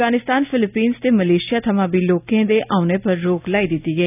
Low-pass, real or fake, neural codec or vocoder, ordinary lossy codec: 3.6 kHz; real; none; none